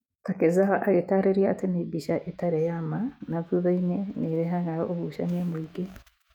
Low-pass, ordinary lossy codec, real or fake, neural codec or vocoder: 19.8 kHz; none; fake; autoencoder, 48 kHz, 128 numbers a frame, DAC-VAE, trained on Japanese speech